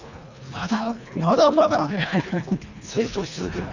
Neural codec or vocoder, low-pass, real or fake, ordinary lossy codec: codec, 24 kHz, 1.5 kbps, HILCodec; 7.2 kHz; fake; none